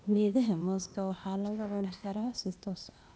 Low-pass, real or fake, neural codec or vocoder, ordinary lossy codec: none; fake; codec, 16 kHz, 0.8 kbps, ZipCodec; none